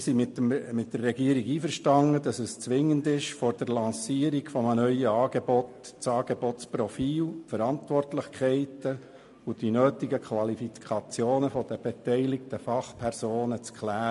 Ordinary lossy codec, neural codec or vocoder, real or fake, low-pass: MP3, 48 kbps; none; real; 14.4 kHz